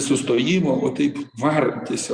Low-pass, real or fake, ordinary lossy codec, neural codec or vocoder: 9.9 kHz; real; Opus, 64 kbps; none